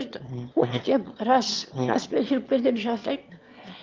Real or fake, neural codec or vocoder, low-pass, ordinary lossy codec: fake; autoencoder, 22.05 kHz, a latent of 192 numbers a frame, VITS, trained on one speaker; 7.2 kHz; Opus, 32 kbps